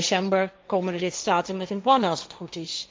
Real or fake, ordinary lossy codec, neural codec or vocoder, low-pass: fake; none; codec, 16 kHz, 1.1 kbps, Voila-Tokenizer; none